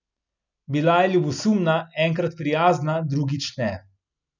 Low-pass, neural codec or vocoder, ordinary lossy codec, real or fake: 7.2 kHz; none; none; real